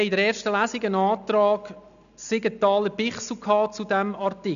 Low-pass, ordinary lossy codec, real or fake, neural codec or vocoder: 7.2 kHz; none; real; none